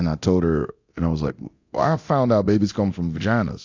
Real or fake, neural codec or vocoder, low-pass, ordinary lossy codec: fake; codec, 24 kHz, 0.9 kbps, DualCodec; 7.2 kHz; AAC, 48 kbps